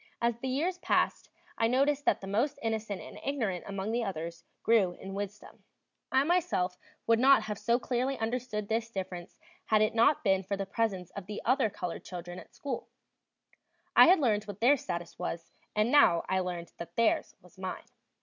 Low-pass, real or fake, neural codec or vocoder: 7.2 kHz; real; none